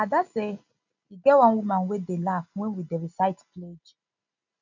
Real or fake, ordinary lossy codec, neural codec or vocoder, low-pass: real; none; none; 7.2 kHz